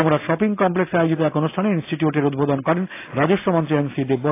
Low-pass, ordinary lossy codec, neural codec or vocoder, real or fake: 3.6 kHz; AAC, 24 kbps; none; real